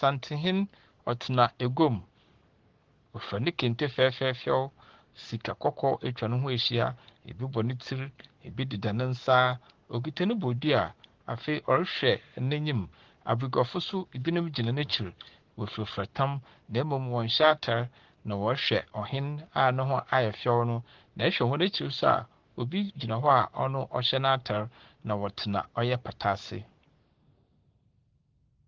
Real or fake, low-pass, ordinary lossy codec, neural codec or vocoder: fake; 7.2 kHz; Opus, 16 kbps; codec, 44.1 kHz, 7.8 kbps, Pupu-Codec